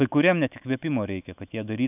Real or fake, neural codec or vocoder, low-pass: fake; autoencoder, 48 kHz, 128 numbers a frame, DAC-VAE, trained on Japanese speech; 3.6 kHz